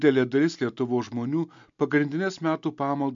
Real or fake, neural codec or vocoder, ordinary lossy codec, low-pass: real; none; MP3, 64 kbps; 7.2 kHz